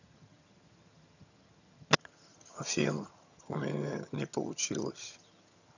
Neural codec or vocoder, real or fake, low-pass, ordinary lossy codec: vocoder, 22.05 kHz, 80 mel bands, HiFi-GAN; fake; 7.2 kHz; none